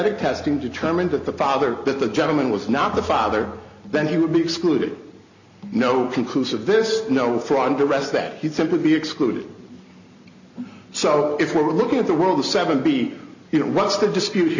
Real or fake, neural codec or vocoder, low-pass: real; none; 7.2 kHz